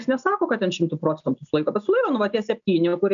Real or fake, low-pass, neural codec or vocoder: real; 7.2 kHz; none